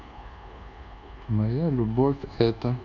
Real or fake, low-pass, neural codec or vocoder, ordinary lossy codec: fake; 7.2 kHz; codec, 24 kHz, 1.2 kbps, DualCodec; none